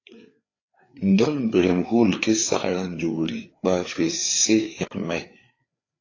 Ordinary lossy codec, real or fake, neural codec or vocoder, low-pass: AAC, 32 kbps; fake; codec, 16 kHz, 4 kbps, FreqCodec, larger model; 7.2 kHz